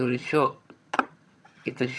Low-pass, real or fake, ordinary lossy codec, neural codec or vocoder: none; fake; none; vocoder, 22.05 kHz, 80 mel bands, HiFi-GAN